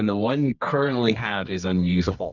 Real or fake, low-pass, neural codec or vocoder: fake; 7.2 kHz; codec, 24 kHz, 0.9 kbps, WavTokenizer, medium music audio release